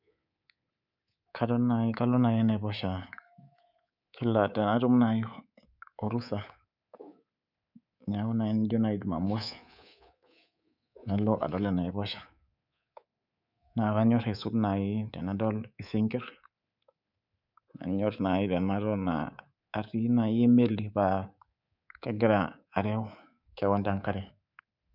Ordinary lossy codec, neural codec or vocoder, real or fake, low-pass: none; codec, 24 kHz, 3.1 kbps, DualCodec; fake; 5.4 kHz